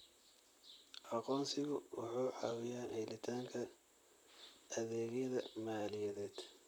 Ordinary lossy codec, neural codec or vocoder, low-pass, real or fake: none; vocoder, 44.1 kHz, 128 mel bands, Pupu-Vocoder; none; fake